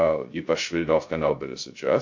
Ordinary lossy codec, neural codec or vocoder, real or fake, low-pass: MP3, 48 kbps; codec, 16 kHz, 0.2 kbps, FocalCodec; fake; 7.2 kHz